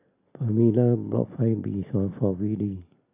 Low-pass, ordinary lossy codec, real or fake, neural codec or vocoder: 3.6 kHz; AAC, 24 kbps; real; none